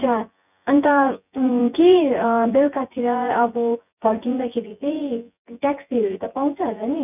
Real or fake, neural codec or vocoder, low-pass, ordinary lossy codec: fake; vocoder, 24 kHz, 100 mel bands, Vocos; 3.6 kHz; none